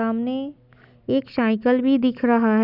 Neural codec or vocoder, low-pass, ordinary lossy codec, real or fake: none; 5.4 kHz; none; real